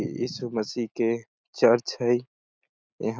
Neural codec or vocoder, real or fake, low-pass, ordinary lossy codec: none; real; none; none